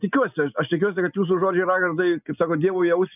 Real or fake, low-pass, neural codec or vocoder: real; 3.6 kHz; none